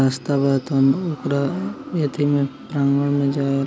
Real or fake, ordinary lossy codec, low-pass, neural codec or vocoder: real; none; none; none